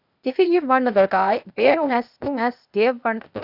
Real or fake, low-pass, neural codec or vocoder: fake; 5.4 kHz; codec, 16 kHz, 0.8 kbps, ZipCodec